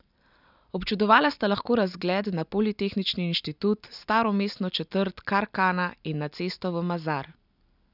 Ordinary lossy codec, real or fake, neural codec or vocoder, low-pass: none; real; none; 5.4 kHz